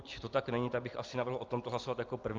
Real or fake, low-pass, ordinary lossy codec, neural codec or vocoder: real; 7.2 kHz; Opus, 24 kbps; none